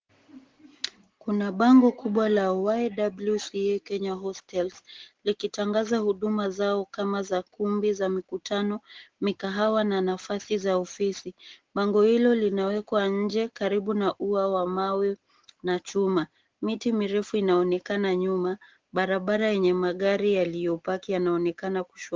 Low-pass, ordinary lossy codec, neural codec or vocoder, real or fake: 7.2 kHz; Opus, 16 kbps; none; real